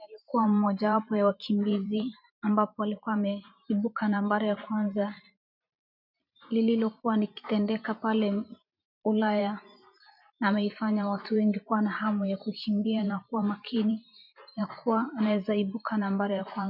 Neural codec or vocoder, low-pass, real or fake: vocoder, 44.1 kHz, 128 mel bands every 512 samples, BigVGAN v2; 5.4 kHz; fake